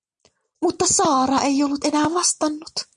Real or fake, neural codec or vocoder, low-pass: real; none; 9.9 kHz